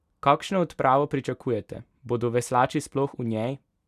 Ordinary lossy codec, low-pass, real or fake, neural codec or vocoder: none; 14.4 kHz; real; none